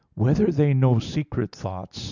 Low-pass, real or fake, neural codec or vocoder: 7.2 kHz; real; none